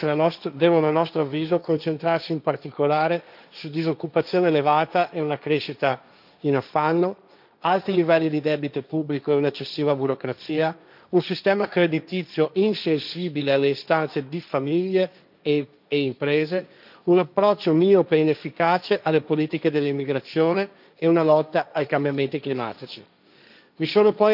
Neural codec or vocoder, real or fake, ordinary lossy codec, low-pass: codec, 16 kHz, 1.1 kbps, Voila-Tokenizer; fake; none; 5.4 kHz